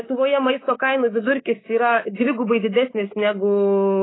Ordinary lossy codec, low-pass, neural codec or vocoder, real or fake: AAC, 16 kbps; 7.2 kHz; codec, 24 kHz, 3.1 kbps, DualCodec; fake